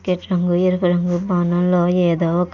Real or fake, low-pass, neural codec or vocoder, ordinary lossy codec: real; 7.2 kHz; none; none